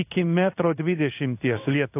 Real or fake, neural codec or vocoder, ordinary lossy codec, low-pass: fake; codec, 16 kHz in and 24 kHz out, 1 kbps, XY-Tokenizer; AAC, 32 kbps; 3.6 kHz